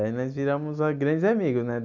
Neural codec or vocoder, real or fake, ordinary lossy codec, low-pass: none; real; none; 7.2 kHz